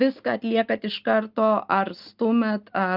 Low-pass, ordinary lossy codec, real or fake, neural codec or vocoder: 5.4 kHz; Opus, 24 kbps; real; none